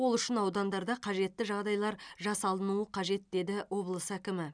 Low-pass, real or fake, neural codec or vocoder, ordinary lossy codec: 9.9 kHz; real; none; none